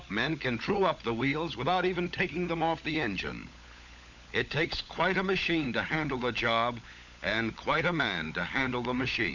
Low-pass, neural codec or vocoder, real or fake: 7.2 kHz; codec, 16 kHz, 16 kbps, FunCodec, trained on LibriTTS, 50 frames a second; fake